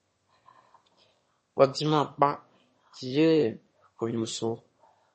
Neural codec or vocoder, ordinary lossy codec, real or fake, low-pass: autoencoder, 22.05 kHz, a latent of 192 numbers a frame, VITS, trained on one speaker; MP3, 32 kbps; fake; 9.9 kHz